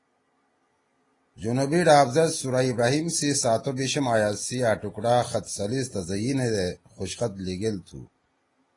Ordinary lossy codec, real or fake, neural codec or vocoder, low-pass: AAC, 32 kbps; real; none; 10.8 kHz